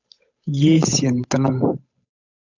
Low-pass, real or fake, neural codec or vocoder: 7.2 kHz; fake; codec, 16 kHz, 8 kbps, FunCodec, trained on Chinese and English, 25 frames a second